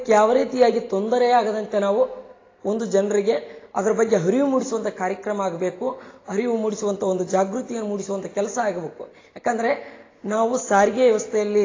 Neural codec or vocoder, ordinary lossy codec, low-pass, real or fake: none; AAC, 32 kbps; 7.2 kHz; real